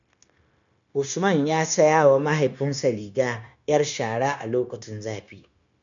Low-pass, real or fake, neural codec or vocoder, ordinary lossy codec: 7.2 kHz; fake; codec, 16 kHz, 0.9 kbps, LongCat-Audio-Codec; none